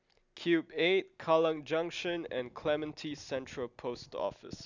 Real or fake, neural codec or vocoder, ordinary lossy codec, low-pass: real; none; none; 7.2 kHz